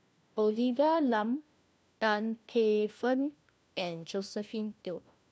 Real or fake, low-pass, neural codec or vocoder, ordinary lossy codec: fake; none; codec, 16 kHz, 1 kbps, FunCodec, trained on LibriTTS, 50 frames a second; none